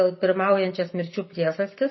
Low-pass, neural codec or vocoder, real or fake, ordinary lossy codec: 7.2 kHz; none; real; MP3, 24 kbps